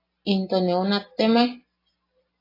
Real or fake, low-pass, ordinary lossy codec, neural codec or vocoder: real; 5.4 kHz; AAC, 24 kbps; none